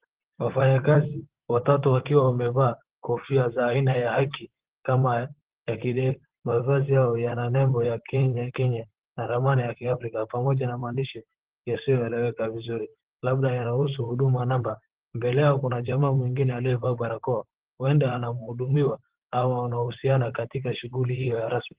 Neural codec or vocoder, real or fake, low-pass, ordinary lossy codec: vocoder, 44.1 kHz, 128 mel bands, Pupu-Vocoder; fake; 3.6 kHz; Opus, 16 kbps